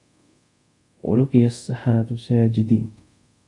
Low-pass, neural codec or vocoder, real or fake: 10.8 kHz; codec, 24 kHz, 0.5 kbps, DualCodec; fake